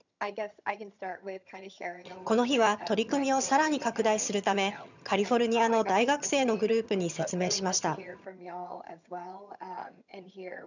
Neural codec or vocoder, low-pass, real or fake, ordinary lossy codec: vocoder, 22.05 kHz, 80 mel bands, HiFi-GAN; 7.2 kHz; fake; none